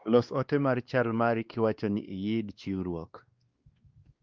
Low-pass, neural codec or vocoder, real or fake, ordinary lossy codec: 7.2 kHz; codec, 16 kHz, 2 kbps, X-Codec, WavLM features, trained on Multilingual LibriSpeech; fake; Opus, 24 kbps